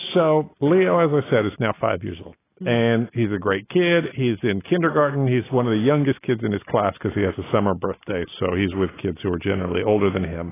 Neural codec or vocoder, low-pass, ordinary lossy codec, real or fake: none; 3.6 kHz; AAC, 16 kbps; real